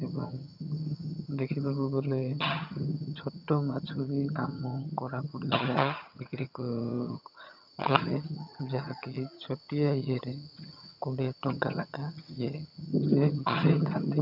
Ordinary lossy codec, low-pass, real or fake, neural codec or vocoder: none; 5.4 kHz; fake; vocoder, 22.05 kHz, 80 mel bands, HiFi-GAN